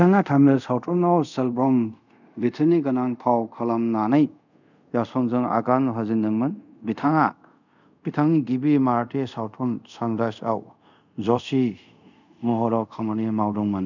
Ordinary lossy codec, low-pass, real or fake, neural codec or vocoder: none; 7.2 kHz; fake; codec, 24 kHz, 0.5 kbps, DualCodec